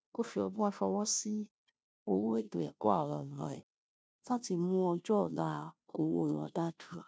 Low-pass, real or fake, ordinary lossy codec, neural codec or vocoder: none; fake; none; codec, 16 kHz, 0.5 kbps, FunCodec, trained on Chinese and English, 25 frames a second